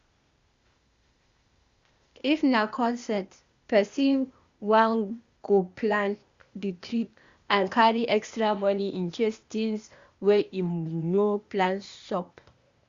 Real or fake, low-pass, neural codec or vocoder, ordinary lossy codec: fake; 7.2 kHz; codec, 16 kHz, 0.8 kbps, ZipCodec; Opus, 64 kbps